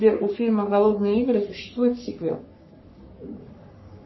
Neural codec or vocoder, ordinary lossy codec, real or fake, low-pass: codec, 44.1 kHz, 3.4 kbps, Pupu-Codec; MP3, 24 kbps; fake; 7.2 kHz